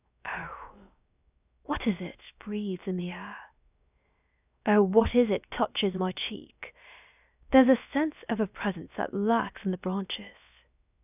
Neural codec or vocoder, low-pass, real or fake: codec, 16 kHz, 0.7 kbps, FocalCodec; 3.6 kHz; fake